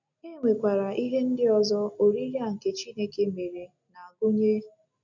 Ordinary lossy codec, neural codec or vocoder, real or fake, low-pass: none; none; real; 7.2 kHz